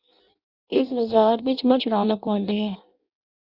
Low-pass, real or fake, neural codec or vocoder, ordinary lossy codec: 5.4 kHz; fake; codec, 16 kHz in and 24 kHz out, 0.6 kbps, FireRedTTS-2 codec; Opus, 64 kbps